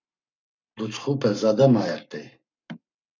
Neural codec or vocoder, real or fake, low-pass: codec, 44.1 kHz, 7.8 kbps, Pupu-Codec; fake; 7.2 kHz